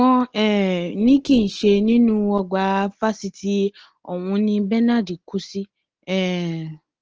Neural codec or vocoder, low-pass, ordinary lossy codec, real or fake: none; 7.2 kHz; Opus, 16 kbps; real